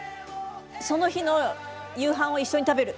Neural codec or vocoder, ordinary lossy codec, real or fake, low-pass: none; none; real; none